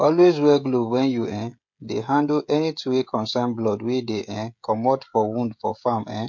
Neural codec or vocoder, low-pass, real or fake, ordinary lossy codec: codec, 16 kHz, 16 kbps, FreqCodec, smaller model; 7.2 kHz; fake; MP3, 48 kbps